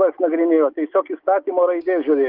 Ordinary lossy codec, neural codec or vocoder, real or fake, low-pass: Opus, 24 kbps; none; real; 5.4 kHz